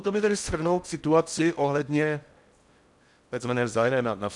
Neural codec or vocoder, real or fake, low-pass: codec, 16 kHz in and 24 kHz out, 0.6 kbps, FocalCodec, streaming, 4096 codes; fake; 10.8 kHz